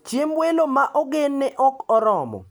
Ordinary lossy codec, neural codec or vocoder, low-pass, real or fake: none; none; none; real